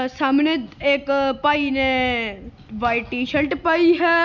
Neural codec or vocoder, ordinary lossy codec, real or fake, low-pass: none; none; real; 7.2 kHz